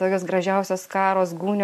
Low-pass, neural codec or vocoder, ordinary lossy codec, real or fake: 14.4 kHz; none; MP3, 64 kbps; real